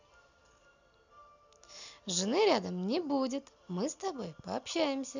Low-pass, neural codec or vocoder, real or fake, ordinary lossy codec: 7.2 kHz; none; real; none